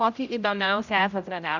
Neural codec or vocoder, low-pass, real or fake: codec, 16 kHz, 0.5 kbps, X-Codec, HuBERT features, trained on general audio; 7.2 kHz; fake